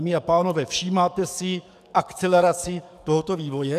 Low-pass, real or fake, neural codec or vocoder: 14.4 kHz; fake; codec, 44.1 kHz, 7.8 kbps, DAC